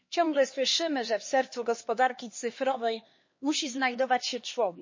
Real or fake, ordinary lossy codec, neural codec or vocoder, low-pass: fake; MP3, 32 kbps; codec, 16 kHz, 2 kbps, X-Codec, HuBERT features, trained on LibriSpeech; 7.2 kHz